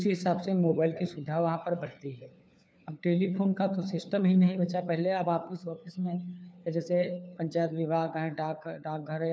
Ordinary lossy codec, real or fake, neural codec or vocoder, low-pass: none; fake; codec, 16 kHz, 4 kbps, FunCodec, trained on LibriTTS, 50 frames a second; none